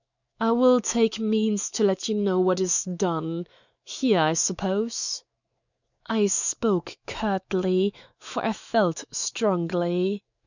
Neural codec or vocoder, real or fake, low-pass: autoencoder, 48 kHz, 128 numbers a frame, DAC-VAE, trained on Japanese speech; fake; 7.2 kHz